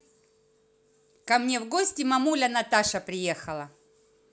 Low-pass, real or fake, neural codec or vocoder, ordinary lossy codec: none; real; none; none